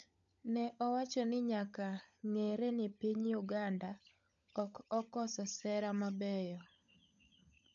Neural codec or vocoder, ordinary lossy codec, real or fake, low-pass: codec, 16 kHz, 16 kbps, FunCodec, trained on LibriTTS, 50 frames a second; none; fake; 7.2 kHz